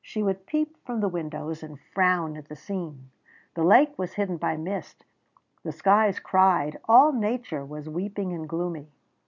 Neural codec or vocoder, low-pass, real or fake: none; 7.2 kHz; real